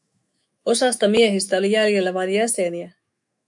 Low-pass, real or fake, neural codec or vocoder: 10.8 kHz; fake; autoencoder, 48 kHz, 128 numbers a frame, DAC-VAE, trained on Japanese speech